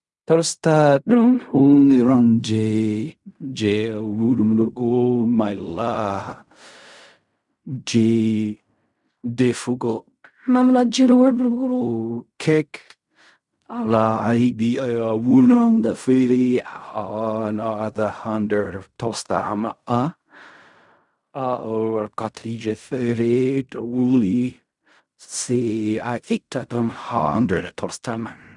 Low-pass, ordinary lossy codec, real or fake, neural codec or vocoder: 10.8 kHz; none; fake; codec, 16 kHz in and 24 kHz out, 0.4 kbps, LongCat-Audio-Codec, fine tuned four codebook decoder